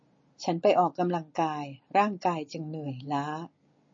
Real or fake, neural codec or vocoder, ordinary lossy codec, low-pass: real; none; MP3, 32 kbps; 7.2 kHz